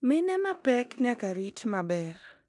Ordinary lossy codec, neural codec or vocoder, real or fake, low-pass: none; codec, 16 kHz in and 24 kHz out, 0.9 kbps, LongCat-Audio-Codec, four codebook decoder; fake; 10.8 kHz